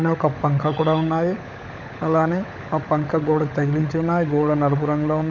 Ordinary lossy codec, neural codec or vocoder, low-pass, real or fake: none; codec, 16 kHz, 16 kbps, FunCodec, trained on LibriTTS, 50 frames a second; 7.2 kHz; fake